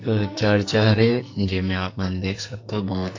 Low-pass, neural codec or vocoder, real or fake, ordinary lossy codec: 7.2 kHz; codec, 44.1 kHz, 2.6 kbps, SNAC; fake; AAC, 48 kbps